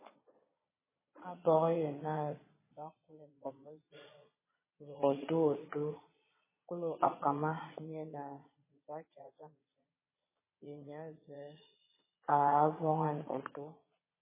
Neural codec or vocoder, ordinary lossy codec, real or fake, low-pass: vocoder, 22.05 kHz, 80 mel bands, Vocos; MP3, 16 kbps; fake; 3.6 kHz